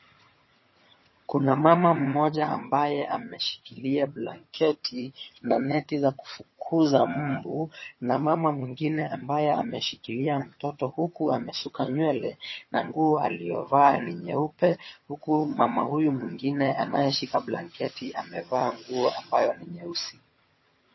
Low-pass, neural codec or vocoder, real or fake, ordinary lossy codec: 7.2 kHz; vocoder, 22.05 kHz, 80 mel bands, HiFi-GAN; fake; MP3, 24 kbps